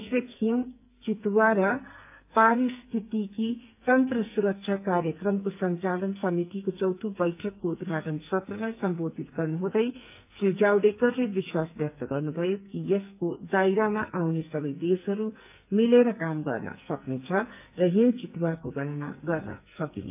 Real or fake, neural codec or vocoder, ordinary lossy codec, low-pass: fake; codec, 44.1 kHz, 2.6 kbps, SNAC; AAC, 32 kbps; 3.6 kHz